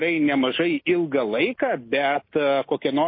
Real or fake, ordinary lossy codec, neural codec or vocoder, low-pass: real; MP3, 24 kbps; none; 5.4 kHz